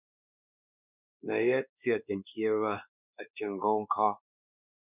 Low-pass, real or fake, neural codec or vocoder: 3.6 kHz; fake; codec, 16 kHz, 2 kbps, X-Codec, WavLM features, trained on Multilingual LibriSpeech